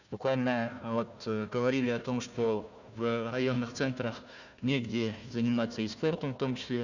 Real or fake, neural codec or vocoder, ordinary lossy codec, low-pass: fake; codec, 16 kHz, 1 kbps, FunCodec, trained on Chinese and English, 50 frames a second; none; 7.2 kHz